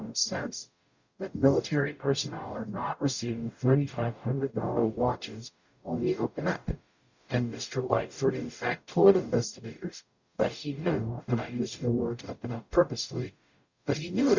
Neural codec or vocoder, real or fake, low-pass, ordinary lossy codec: codec, 44.1 kHz, 0.9 kbps, DAC; fake; 7.2 kHz; Opus, 64 kbps